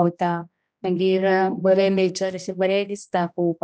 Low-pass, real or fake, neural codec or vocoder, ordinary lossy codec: none; fake; codec, 16 kHz, 1 kbps, X-Codec, HuBERT features, trained on general audio; none